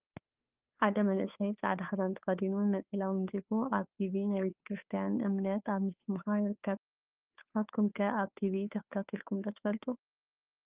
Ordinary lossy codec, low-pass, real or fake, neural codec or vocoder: Opus, 24 kbps; 3.6 kHz; fake; codec, 16 kHz, 2 kbps, FunCodec, trained on Chinese and English, 25 frames a second